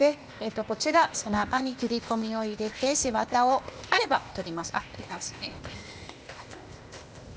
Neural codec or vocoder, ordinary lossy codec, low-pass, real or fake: codec, 16 kHz, 0.8 kbps, ZipCodec; none; none; fake